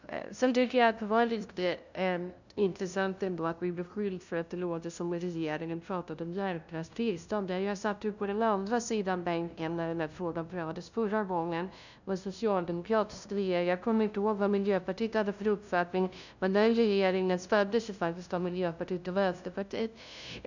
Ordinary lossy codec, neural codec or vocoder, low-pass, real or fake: none; codec, 16 kHz, 0.5 kbps, FunCodec, trained on LibriTTS, 25 frames a second; 7.2 kHz; fake